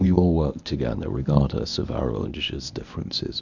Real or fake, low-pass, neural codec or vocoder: fake; 7.2 kHz; codec, 24 kHz, 0.9 kbps, WavTokenizer, medium speech release version 1